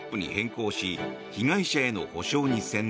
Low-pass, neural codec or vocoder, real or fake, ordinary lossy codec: none; none; real; none